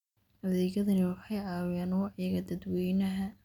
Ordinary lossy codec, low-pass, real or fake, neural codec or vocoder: none; 19.8 kHz; real; none